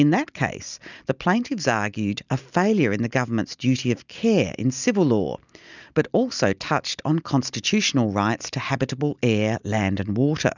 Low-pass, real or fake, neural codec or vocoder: 7.2 kHz; real; none